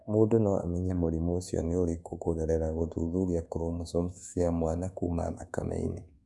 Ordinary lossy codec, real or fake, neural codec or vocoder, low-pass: none; fake; codec, 24 kHz, 1.2 kbps, DualCodec; none